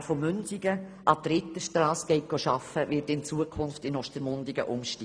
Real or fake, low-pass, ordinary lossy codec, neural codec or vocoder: real; 9.9 kHz; none; none